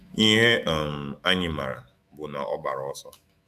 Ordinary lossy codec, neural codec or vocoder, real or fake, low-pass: AAC, 96 kbps; autoencoder, 48 kHz, 128 numbers a frame, DAC-VAE, trained on Japanese speech; fake; 14.4 kHz